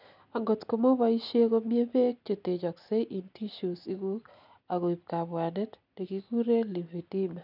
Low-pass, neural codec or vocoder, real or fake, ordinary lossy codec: 5.4 kHz; none; real; none